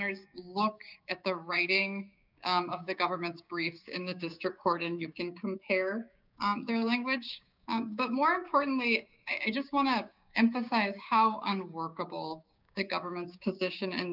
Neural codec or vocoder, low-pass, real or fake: codec, 16 kHz, 6 kbps, DAC; 5.4 kHz; fake